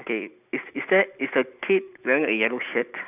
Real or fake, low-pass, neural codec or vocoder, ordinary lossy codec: real; 3.6 kHz; none; none